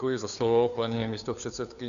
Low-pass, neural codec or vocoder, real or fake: 7.2 kHz; codec, 16 kHz, 2 kbps, FunCodec, trained on Chinese and English, 25 frames a second; fake